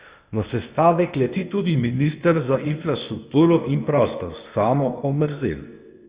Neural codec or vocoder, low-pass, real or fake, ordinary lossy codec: codec, 16 kHz, 0.8 kbps, ZipCodec; 3.6 kHz; fake; Opus, 64 kbps